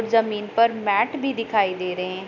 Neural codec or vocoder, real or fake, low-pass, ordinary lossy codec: none; real; 7.2 kHz; none